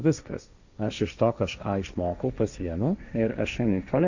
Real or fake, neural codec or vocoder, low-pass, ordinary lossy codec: fake; codec, 16 kHz, 1.1 kbps, Voila-Tokenizer; 7.2 kHz; Opus, 64 kbps